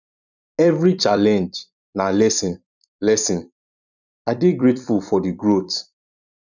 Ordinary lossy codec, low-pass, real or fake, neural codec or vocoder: none; 7.2 kHz; fake; vocoder, 44.1 kHz, 128 mel bands every 256 samples, BigVGAN v2